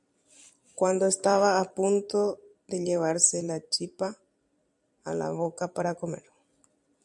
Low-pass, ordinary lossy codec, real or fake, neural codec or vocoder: 10.8 kHz; MP3, 64 kbps; fake; vocoder, 44.1 kHz, 128 mel bands every 256 samples, BigVGAN v2